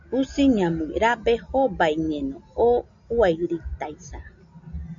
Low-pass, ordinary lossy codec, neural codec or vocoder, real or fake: 7.2 kHz; MP3, 64 kbps; none; real